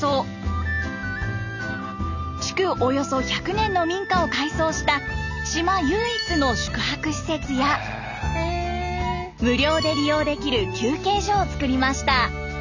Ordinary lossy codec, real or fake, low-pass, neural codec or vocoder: none; real; 7.2 kHz; none